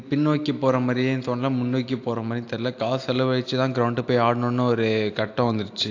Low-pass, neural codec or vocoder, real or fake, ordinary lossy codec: 7.2 kHz; none; real; AAC, 48 kbps